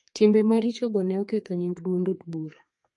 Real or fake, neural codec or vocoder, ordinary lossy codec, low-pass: fake; codec, 32 kHz, 1.9 kbps, SNAC; MP3, 48 kbps; 10.8 kHz